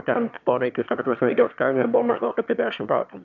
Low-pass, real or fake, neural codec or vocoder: 7.2 kHz; fake; autoencoder, 22.05 kHz, a latent of 192 numbers a frame, VITS, trained on one speaker